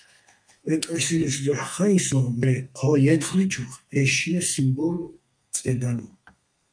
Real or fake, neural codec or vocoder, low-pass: fake; codec, 32 kHz, 1.9 kbps, SNAC; 9.9 kHz